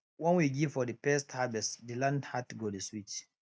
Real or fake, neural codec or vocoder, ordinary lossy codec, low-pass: real; none; none; none